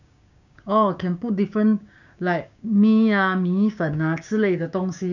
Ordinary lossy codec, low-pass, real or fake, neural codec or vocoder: none; 7.2 kHz; fake; codec, 16 kHz, 6 kbps, DAC